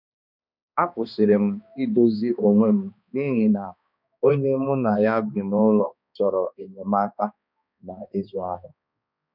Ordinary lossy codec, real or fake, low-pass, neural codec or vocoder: none; fake; 5.4 kHz; codec, 16 kHz, 2 kbps, X-Codec, HuBERT features, trained on balanced general audio